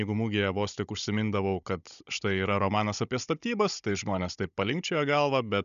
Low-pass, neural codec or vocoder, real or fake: 7.2 kHz; codec, 16 kHz, 16 kbps, FunCodec, trained on Chinese and English, 50 frames a second; fake